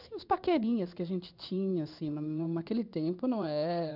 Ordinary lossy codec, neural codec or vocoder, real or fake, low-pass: none; codec, 16 kHz in and 24 kHz out, 1 kbps, XY-Tokenizer; fake; 5.4 kHz